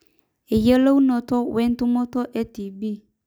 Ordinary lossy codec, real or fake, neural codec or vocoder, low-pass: none; real; none; none